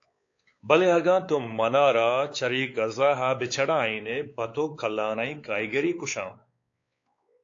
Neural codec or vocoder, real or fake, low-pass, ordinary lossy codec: codec, 16 kHz, 4 kbps, X-Codec, WavLM features, trained on Multilingual LibriSpeech; fake; 7.2 kHz; AAC, 48 kbps